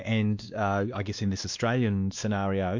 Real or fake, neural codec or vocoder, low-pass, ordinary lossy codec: fake; codec, 16 kHz, 2 kbps, X-Codec, WavLM features, trained on Multilingual LibriSpeech; 7.2 kHz; MP3, 64 kbps